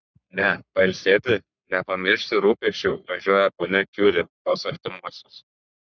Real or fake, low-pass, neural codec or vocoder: fake; 7.2 kHz; codec, 44.1 kHz, 3.4 kbps, Pupu-Codec